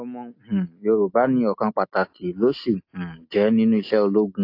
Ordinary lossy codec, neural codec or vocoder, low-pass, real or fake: AAC, 32 kbps; none; 5.4 kHz; real